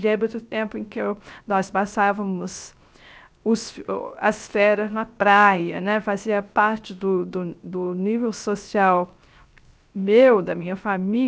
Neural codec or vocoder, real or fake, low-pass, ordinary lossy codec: codec, 16 kHz, 0.3 kbps, FocalCodec; fake; none; none